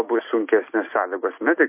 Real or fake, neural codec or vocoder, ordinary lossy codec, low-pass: real; none; MP3, 24 kbps; 3.6 kHz